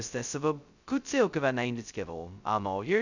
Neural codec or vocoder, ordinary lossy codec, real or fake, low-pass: codec, 16 kHz, 0.2 kbps, FocalCodec; none; fake; 7.2 kHz